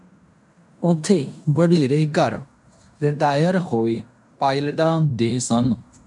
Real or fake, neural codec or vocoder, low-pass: fake; codec, 16 kHz in and 24 kHz out, 0.9 kbps, LongCat-Audio-Codec, fine tuned four codebook decoder; 10.8 kHz